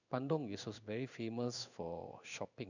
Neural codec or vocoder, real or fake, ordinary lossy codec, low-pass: codec, 16 kHz in and 24 kHz out, 1 kbps, XY-Tokenizer; fake; none; 7.2 kHz